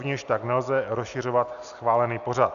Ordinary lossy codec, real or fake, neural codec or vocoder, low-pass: AAC, 96 kbps; real; none; 7.2 kHz